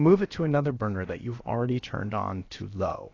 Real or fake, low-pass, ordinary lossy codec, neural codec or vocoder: fake; 7.2 kHz; AAC, 32 kbps; codec, 16 kHz, about 1 kbps, DyCAST, with the encoder's durations